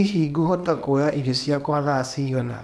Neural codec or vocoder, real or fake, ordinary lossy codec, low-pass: codec, 24 kHz, 0.9 kbps, WavTokenizer, small release; fake; none; none